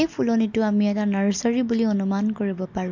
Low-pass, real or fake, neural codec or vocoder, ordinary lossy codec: 7.2 kHz; real; none; MP3, 48 kbps